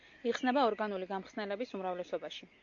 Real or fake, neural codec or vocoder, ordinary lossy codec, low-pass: real; none; AAC, 48 kbps; 7.2 kHz